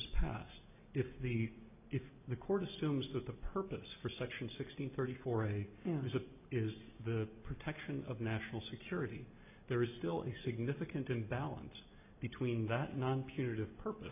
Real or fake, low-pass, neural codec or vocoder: real; 3.6 kHz; none